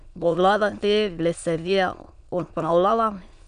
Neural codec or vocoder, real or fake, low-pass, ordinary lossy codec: autoencoder, 22.05 kHz, a latent of 192 numbers a frame, VITS, trained on many speakers; fake; 9.9 kHz; none